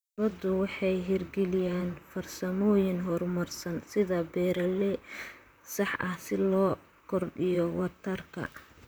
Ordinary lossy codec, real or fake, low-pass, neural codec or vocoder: none; fake; none; vocoder, 44.1 kHz, 128 mel bands, Pupu-Vocoder